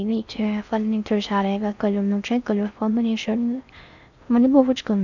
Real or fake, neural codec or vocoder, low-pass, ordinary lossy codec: fake; codec, 16 kHz in and 24 kHz out, 0.6 kbps, FocalCodec, streaming, 4096 codes; 7.2 kHz; none